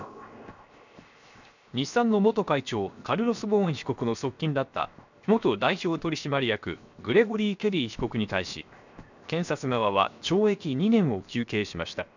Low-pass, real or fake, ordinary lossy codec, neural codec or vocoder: 7.2 kHz; fake; none; codec, 16 kHz, 0.7 kbps, FocalCodec